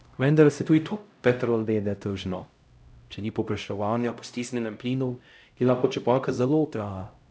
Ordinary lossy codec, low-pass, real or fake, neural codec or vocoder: none; none; fake; codec, 16 kHz, 0.5 kbps, X-Codec, HuBERT features, trained on LibriSpeech